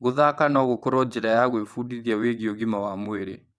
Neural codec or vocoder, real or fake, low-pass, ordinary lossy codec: vocoder, 22.05 kHz, 80 mel bands, WaveNeXt; fake; none; none